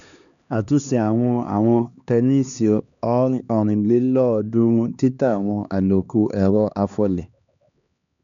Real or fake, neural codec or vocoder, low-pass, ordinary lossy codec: fake; codec, 16 kHz, 2 kbps, X-Codec, HuBERT features, trained on LibriSpeech; 7.2 kHz; none